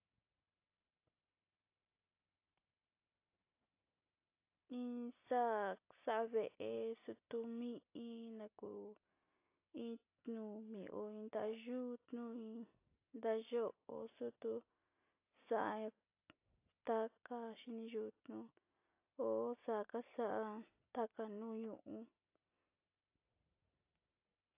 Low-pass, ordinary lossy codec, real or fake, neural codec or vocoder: 3.6 kHz; AAC, 24 kbps; real; none